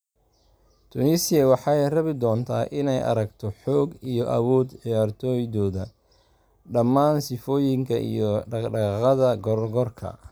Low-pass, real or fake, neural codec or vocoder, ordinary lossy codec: none; real; none; none